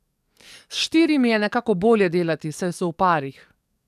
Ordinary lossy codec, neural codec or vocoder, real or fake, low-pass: none; codec, 44.1 kHz, 7.8 kbps, DAC; fake; 14.4 kHz